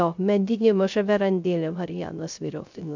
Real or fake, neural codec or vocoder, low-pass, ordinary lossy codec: fake; codec, 16 kHz, 0.3 kbps, FocalCodec; 7.2 kHz; MP3, 64 kbps